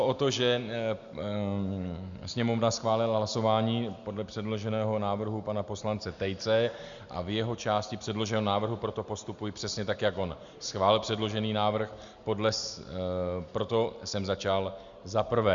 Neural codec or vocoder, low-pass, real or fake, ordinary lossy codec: none; 7.2 kHz; real; Opus, 64 kbps